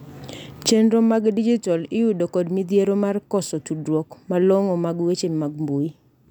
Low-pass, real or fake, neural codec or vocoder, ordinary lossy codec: 19.8 kHz; real; none; none